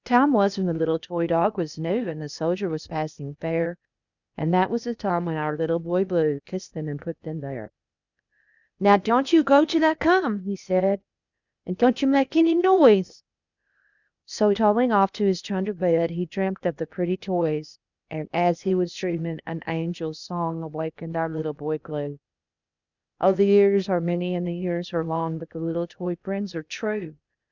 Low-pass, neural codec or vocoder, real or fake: 7.2 kHz; codec, 16 kHz, 0.8 kbps, ZipCodec; fake